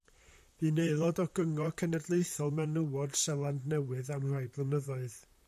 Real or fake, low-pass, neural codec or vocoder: fake; 14.4 kHz; vocoder, 44.1 kHz, 128 mel bands, Pupu-Vocoder